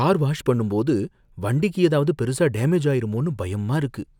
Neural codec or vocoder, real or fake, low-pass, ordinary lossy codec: none; real; 19.8 kHz; none